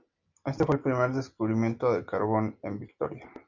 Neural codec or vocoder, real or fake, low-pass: none; real; 7.2 kHz